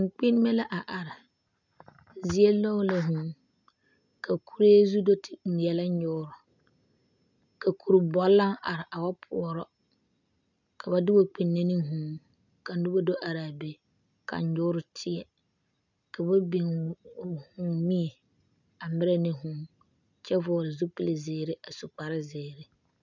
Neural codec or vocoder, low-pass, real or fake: none; 7.2 kHz; real